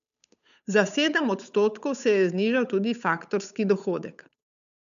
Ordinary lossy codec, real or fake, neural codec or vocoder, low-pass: none; fake; codec, 16 kHz, 8 kbps, FunCodec, trained on Chinese and English, 25 frames a second; 7.2 kHz